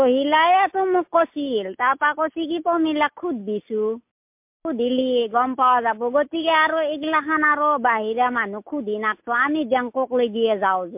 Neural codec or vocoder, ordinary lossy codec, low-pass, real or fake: none; MP3, 32 kbps; 3.6 kHz; real